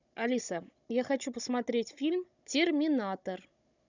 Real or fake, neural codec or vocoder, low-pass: fake; codec, 16 kHz, 16 kbps, FunCodec, trained on Chinese and English, 50 frames a second; 7.2 kHz